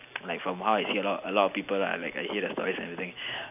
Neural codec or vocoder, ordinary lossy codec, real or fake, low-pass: none; none; real; 3.6 kHz